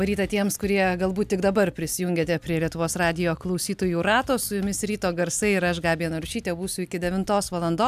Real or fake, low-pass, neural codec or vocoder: real; 14.4 kHz; none